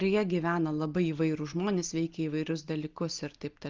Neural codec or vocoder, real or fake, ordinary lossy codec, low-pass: none; real; Opus, 32 kbps; 7.2 kHz